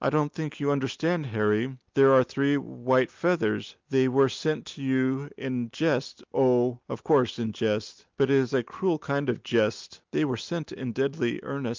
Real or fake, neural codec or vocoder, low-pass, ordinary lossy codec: real; none; 7.2 kHz; Opus, 24 kbps